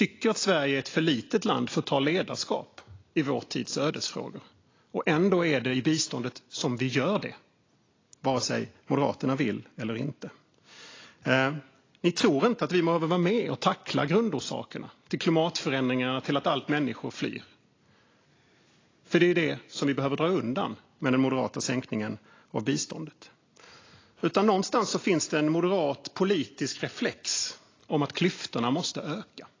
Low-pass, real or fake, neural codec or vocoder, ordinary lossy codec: 7.2 kHz; real; none; AAC, 32 kbps